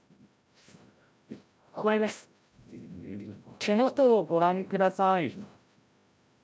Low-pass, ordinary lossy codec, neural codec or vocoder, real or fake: none; none; codec, 16 kHz, 0.5 kbps, FreqCodec, larger model; fake